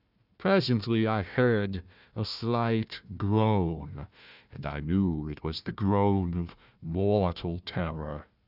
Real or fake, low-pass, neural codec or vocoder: fake; 5.4 kHz; codec, 16 kHz, 1 kbps, FunCodec, trained on Chinese and English, 50 frames a second